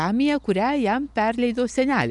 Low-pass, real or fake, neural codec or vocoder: 10.8 kHz; real; none